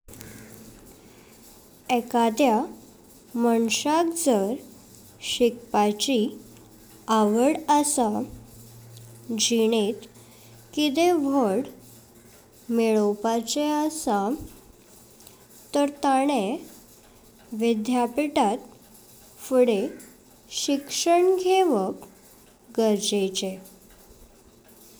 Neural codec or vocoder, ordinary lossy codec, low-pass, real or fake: none; none; none; real